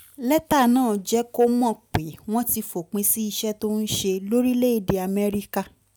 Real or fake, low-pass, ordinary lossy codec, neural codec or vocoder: real; none; none; none